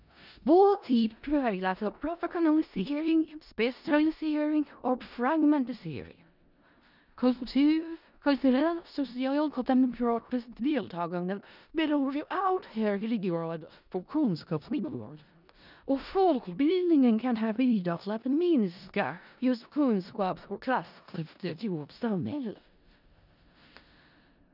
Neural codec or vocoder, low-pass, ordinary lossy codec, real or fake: codec, 16 kHz in and 24 kHz out, 0.4 kbps, LongCat-Audio-Codec, four codebook decoder; 5.4 kHz; none; fake